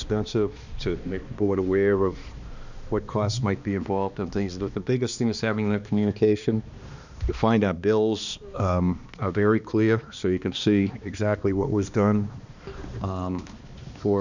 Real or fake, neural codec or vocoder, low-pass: fake; codec, 16 kHz, 2 kbps, X-Codec, HuBERT features, trained on balanced general audio; 7.2 kHz